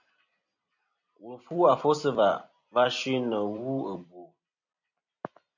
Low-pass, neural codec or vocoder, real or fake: 7.2 kHz; vocoder, 44.1 kHz, 128 mel bands every 256 samples, BigVGAN v2; fake